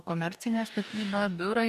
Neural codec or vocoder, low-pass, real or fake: codec, 44.1 kHz, 2.6 kbps, DAC; 14.4 kHz; fake